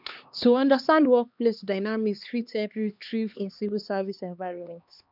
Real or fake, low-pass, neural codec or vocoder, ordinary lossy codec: fake; 5.4 kHz; codec, 16 kHz, 2 kbps, X-Codec, HuBERT features, trained on LibriSpeech; none